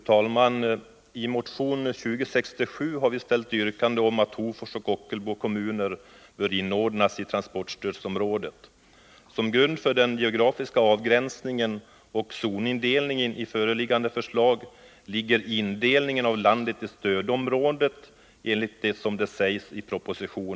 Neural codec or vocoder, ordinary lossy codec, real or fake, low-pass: none; none; real; none